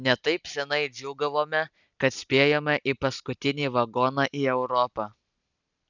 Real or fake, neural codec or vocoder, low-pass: real; none; 7.2 kHz